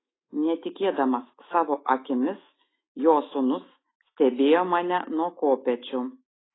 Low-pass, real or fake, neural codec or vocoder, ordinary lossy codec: 7.2 kHz; fake; autoencoder, 48 kHz, 128 numbers a frame, DAC-VAE, trained on Japanese speech; AAC, 16 kbps